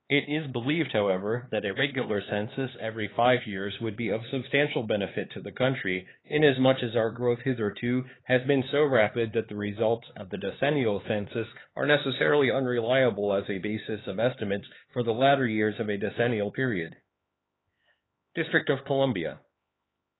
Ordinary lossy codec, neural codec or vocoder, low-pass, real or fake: AAC, 16 kbps; codec, 16 kHz, 4 kbps, X-Codec, HuBERT features, trained on LibriSpeech; 7.2 kHz; fake